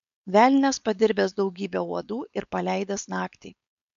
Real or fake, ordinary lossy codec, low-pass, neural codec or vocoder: fake; MP3, 96 kbps; 7.2 kHz; codec, 16 kHz, 4.8 kbps, FACodec